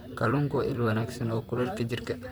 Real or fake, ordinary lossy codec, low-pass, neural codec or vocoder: fake; none; none; vocoder, 44.1 kHz, 128 mel bands, Pupu-Vocoder